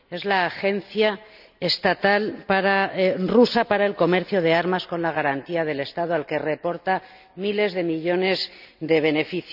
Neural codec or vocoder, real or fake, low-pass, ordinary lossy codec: none; real; 5.4 kHz; none